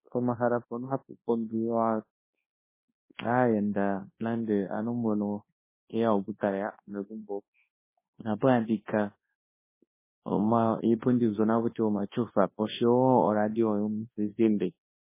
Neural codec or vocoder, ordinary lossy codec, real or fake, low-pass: codec, 24 kHz, 0.9 kbps, WavTokenizer, large speech release; MP3, 16 kbps; fake; 3.6 kHz